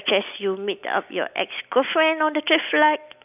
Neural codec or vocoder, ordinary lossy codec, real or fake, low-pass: none; none; real; 3.6 kHz